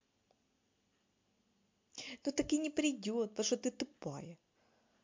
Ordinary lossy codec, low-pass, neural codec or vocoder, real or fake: MP3, 48 kbps; 7.2 kHz; none; real